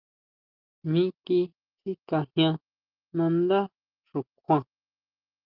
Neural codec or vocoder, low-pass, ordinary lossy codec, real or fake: none; 5.4 kHz; Opus, 24 kbps; real